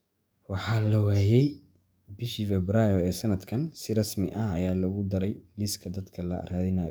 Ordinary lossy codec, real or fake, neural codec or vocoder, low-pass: none; fake; codec, 44.1 kHz, 7.8 kbps, DAC; none